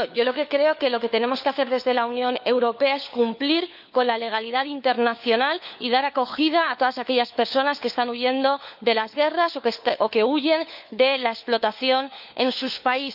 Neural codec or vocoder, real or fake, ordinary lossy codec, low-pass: codec, 16 kHz, 4 kbps, FunCodec, trained on LibriTTS, 50 frames a second; fake; none; 5.4 kHz